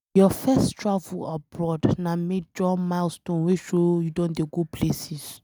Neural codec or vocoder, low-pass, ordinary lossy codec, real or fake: none; none; none; real